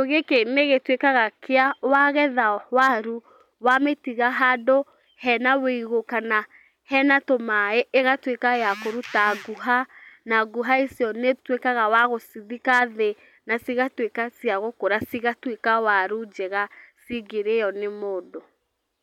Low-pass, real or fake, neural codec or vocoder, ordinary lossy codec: 19.8 kHz; real; none; none